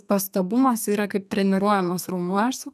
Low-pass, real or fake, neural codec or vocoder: 14.4 kHz; fake; codec, 32 kHz, 1.9 kbps, SNAC